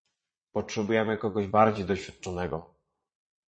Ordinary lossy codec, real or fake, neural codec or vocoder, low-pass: MP3, 32 kbps; fake; vocoder, 22.05 kHz, 80 mel bands, Vocos; 9.9 kHz